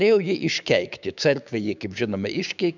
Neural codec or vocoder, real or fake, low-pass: codec, 16 kHz, 6 kbps, DAC; fake; 7.2 kHz